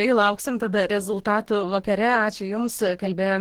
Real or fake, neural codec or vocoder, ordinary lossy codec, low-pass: fake; codec, 44.1 kHz, 2.6 kbps, DAC; Opus, 16 kbps; 19.8 kHz